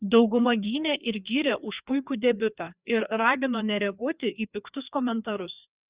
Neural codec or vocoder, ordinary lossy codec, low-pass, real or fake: codec, 16 kHz, 2 kbps, FreqCodec, larger model; Opus, 64 kbps; 3.6 kHz; fake